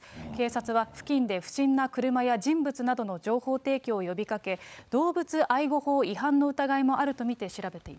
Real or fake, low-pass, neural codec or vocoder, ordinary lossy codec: fake; none; codec, 16 kHz, 16 kbps, FunCodec, trained on LibriTTS, 50 frames a second; none